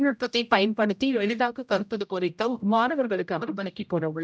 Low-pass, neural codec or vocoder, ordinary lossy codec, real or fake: none; codec, 16 kHz, 0.5 kbps, X-Codec, HuBERT features, trained on general audio; none; fake